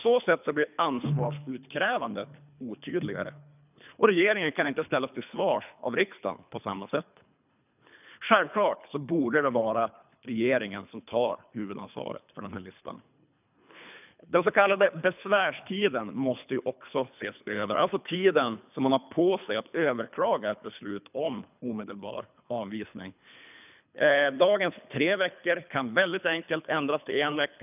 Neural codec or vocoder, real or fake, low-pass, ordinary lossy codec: codec, 24 kHz, 3 kbps, HILCodec; fake; 3.6 kHz; none